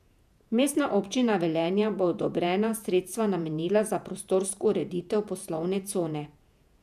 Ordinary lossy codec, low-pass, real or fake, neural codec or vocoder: none; 14.4 kHz; fake; vocoder, 48 kHz, 128 mel bands, Vocos